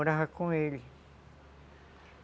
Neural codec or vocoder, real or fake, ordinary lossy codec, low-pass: none; real; none; none